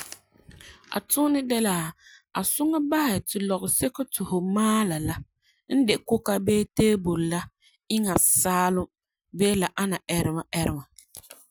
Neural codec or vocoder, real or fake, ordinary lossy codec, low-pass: none; real; none; none